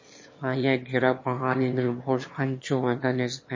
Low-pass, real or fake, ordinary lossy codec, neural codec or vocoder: 7.2 kHz; fake; MP3, 32 kbps; autoencoder, 22.05 kHz, a latent of 192 numbers a frame, VITS, trained on one speaker